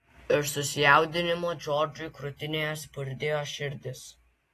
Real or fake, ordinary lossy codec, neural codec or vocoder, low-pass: real; AAC, 48 kbps; none; 14.4 kHz